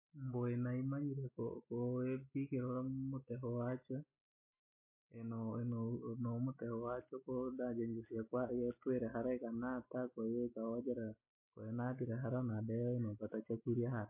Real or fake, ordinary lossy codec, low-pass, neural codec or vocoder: real; MP3, 24 kbps; 3.6 kHz; none